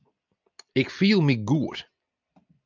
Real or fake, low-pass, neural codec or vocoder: real; 7.2 kHz; none